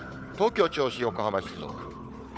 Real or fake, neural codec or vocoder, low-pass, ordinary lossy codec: fake; codec, 16 kHz, 4 kbps, FunCodec, trained on Chinese and English, 50 frames a second; none; none